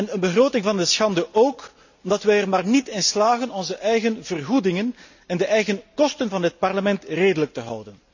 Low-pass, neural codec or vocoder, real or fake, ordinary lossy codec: 7.2 kHz; none; real; none